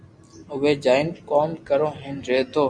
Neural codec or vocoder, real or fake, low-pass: none; real; 9.9 kHz